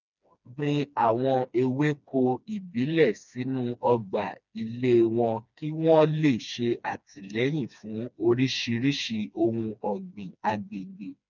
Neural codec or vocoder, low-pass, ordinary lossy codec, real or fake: codec, 16 kHz, 2 kbps, FreqCodec, smaller model; 7.2 kHz; none; fake